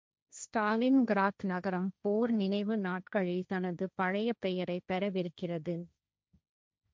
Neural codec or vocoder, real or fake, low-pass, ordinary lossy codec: codec, 16 kHz, 1.1 kbps, Voila-Tokenizer; fake; none; none